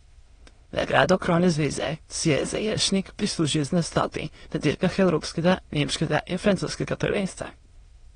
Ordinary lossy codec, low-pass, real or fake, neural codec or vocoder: AAC, 32 kbps; 9.9 kHz; fake; autoencoder, 22.05 kHz, a latent of 192 numbers a frame, VITS, trained on many speakers